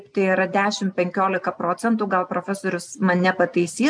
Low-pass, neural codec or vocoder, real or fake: 9.9 kHz; none; real